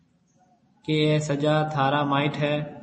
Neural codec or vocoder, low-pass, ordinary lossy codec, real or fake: none; 9.9 kHz; MP3, 32 kbps; real